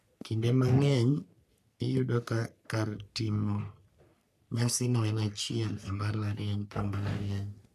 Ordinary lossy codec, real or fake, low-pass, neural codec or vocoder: none; fake; 14.4 kHz; codec, 44.1 kHz, 3.4 kbps, Pupu-Codec